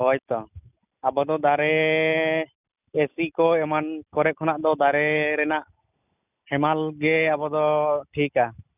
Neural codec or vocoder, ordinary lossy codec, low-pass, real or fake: none; none; 3.6 kHz; real